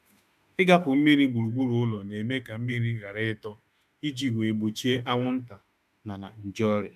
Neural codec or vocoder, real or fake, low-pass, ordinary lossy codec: autoencoder, 48 kHz, 32 numbers a frame, DAC-VAE, trained on Japanese speech; fake; 14.4 kHz; none